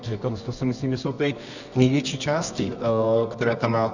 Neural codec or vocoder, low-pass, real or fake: codec, 24 kHz, 0.9 kbps, WavTokenizer, medium music audio release; 7.2 kHz; fake